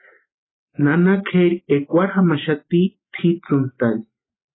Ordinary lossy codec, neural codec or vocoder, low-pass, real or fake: AAC, 16 kbps; none; 7.2 kHz; real